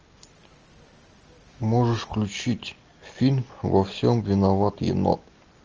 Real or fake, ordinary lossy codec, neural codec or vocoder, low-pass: real; Opus, 24 kbps; none; 7.2 kHz